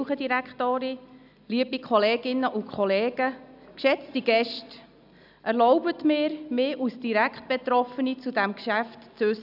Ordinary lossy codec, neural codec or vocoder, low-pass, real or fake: none; none; 5.4 kHz; real